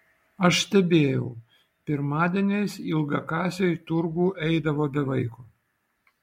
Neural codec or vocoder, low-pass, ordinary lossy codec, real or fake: none; 19.8 kHz; MP3, 64 kbps; real